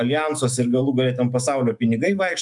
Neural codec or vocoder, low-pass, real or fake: autoencoder, 48 kHz, 128 numbers a frame, DAC-VAE, trained on Japanese speech; 10.8 kHz; fake